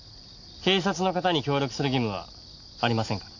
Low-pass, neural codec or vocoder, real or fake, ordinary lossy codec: 7.2 kHz; none; real; none